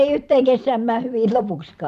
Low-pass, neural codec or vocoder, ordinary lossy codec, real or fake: 14.4 kHz; vocoder, 44.1 kHz, 128 mel bands every 512 samples, BigVGAN v2; none; fake